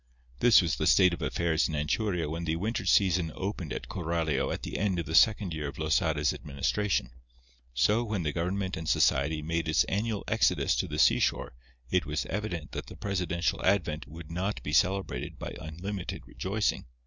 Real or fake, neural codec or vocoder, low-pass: real; none; 7.2 kHz